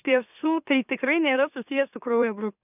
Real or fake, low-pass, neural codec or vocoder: fake; 3.6 kHz; autoencoder, 44.1 kHz, a latent of 192 numbers a frame, MeloTTS